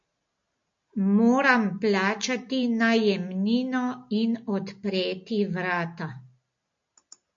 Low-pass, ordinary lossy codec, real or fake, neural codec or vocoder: 7.2 kHz; MP3, 48 kbps; real; none